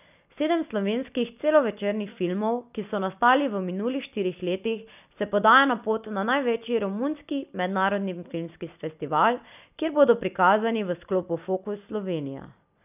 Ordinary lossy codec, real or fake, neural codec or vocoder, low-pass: none; real; none; 3.6 kHz